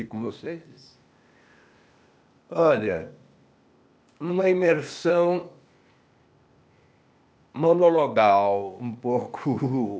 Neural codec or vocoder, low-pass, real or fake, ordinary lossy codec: codec, 16 kHz, 0.8 kbps, ZipCodec; none; fake; none